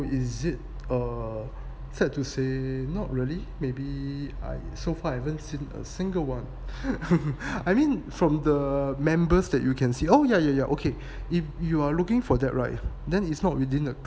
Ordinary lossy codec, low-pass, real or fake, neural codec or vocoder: none; none; real; none